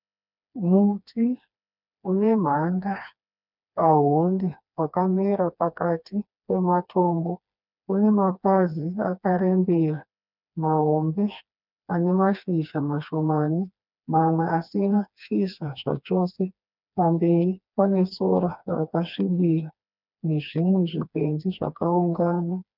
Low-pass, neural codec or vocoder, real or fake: 5.4 kHz; codec, 16 kHz, 2 kbps, FreqCodec, smaller model; fake